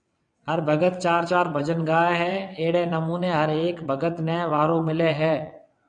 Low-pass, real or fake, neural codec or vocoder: 9.9 kHz; fake; vocoder, 22.05 kHz, 80 mel bands, WaveNeXt